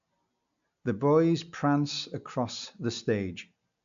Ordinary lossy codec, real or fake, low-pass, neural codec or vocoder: AAC, 64 kbps; real; 7.2 kHz; none